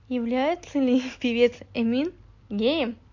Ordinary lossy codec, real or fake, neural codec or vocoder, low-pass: MP3, 48 kbps; real; none; 7.2 kHz